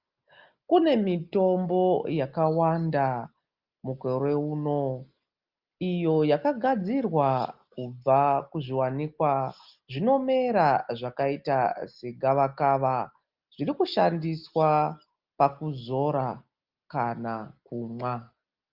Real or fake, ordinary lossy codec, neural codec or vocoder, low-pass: real; Opus, 32 kbps; none; 5.4 kHz